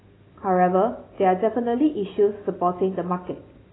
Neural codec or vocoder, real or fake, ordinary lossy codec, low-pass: none; real; AAC, 16 kbps; 7.2 kHz